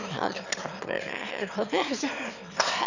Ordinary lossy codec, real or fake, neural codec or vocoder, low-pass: none; fake; autoencoder, 22.05 kHz, a latent of 192 numbers a frame, VITS, trained on one speaker; 7.2 kHz